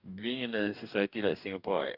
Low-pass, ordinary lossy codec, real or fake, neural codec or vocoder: 5.4 kHz; none; fake; codec, 44.1 kHz, 2.6 kbps, DAC